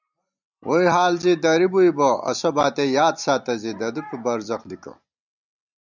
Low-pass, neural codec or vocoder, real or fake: 7.2 kHz; none; real